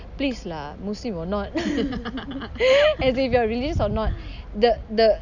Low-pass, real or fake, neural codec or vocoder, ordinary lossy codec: 7.2 kHz; real; none; none